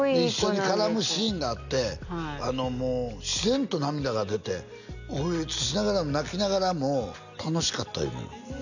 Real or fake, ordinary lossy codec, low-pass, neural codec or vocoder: real; none; 7.2 kHz; none